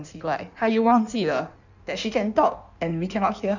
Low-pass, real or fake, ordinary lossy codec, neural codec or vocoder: 7.2 kHz; fake; none; codec, 16 kHz in and 24 kHz out, 1.1 kbps, FireRedTTS-2 codec